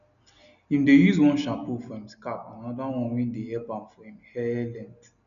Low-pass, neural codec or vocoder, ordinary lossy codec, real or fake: 7.2 kHz; none; none; real